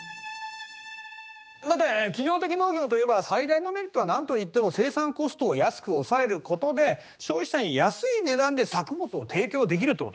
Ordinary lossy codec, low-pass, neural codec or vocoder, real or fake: none; none; codec, 16 kHz, 2 kbps, X-Codec, HuBERT features, trained on general audio; fake